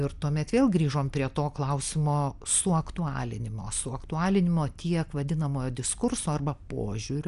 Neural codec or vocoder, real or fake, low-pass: none; real; 10.8 kHz